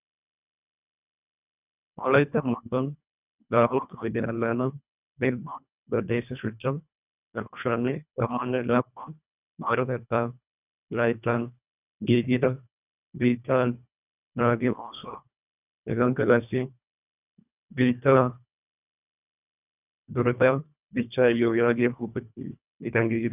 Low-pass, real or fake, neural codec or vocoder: 3.6 kHz; fake; codec, 24 kHz, 1.5 kbps, HILCodec